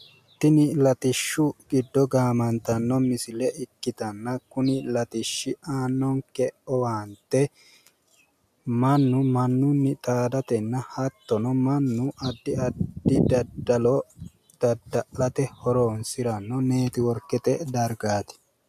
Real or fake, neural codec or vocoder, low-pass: real; none; 14.4 kHz